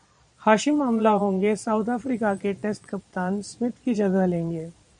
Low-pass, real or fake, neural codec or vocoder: 9.9 kHz; fake; vocoder, 22.05 kHz, 80 mel bands, Vocos